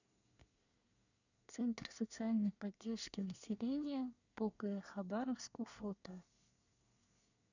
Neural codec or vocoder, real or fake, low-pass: codec, 24 kHz, 1 kbps, SNAC; fake; 7.2 kHz